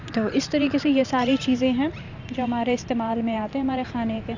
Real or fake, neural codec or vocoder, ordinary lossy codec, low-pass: fake; vocoder, 44.1 kHz, 128 mel bands every 512 samples, BigVGAN v2; none; 7.2 kHz